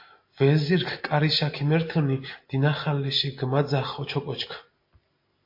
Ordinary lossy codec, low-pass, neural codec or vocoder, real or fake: MP3, 32 kbps; 5.4 kHz; none; real